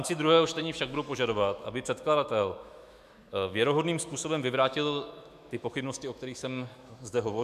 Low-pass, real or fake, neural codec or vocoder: 14.4 kHz; fake; autoencoder, 48 kHz, 128 numbers a frame, DAC-VAE, trained on Japanese speech